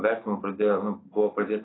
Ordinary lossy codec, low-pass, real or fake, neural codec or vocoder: AAC, 16 kbps; 7.2 kHz; real; none